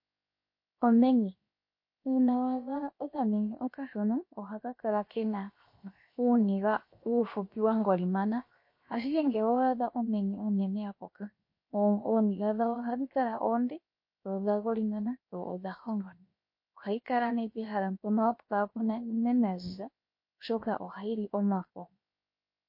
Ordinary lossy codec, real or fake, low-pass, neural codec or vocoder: MP3, 32 kbps; fake; 5.4 kHz; codec, 16 kHz, 0.7 kbps, FocalCodec